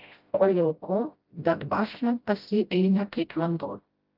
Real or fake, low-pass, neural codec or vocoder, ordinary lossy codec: fake; 5.4 kHz; codec, 16 kHz, 0.5 kbps, FreqCodec, smaller model; Opus, 24 kbps